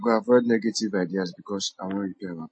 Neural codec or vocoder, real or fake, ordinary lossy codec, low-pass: none; real; MP3, 32 kbps; 9.9 kHz